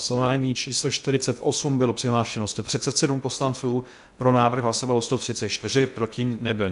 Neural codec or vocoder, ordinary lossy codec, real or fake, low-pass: codec, 16 kHz in and 24 kHz out, 0.6 kbps, FocalCodec, streaming, 2048 codes; MP3, 96 kbps; fake; 10.8 kHz